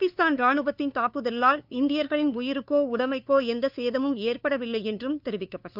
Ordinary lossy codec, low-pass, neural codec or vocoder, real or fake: none; 5.4 kHz; codec, 16 kHz, 2 kbps, FunCodec, trained on LibriTTS, 25 frames a second; fake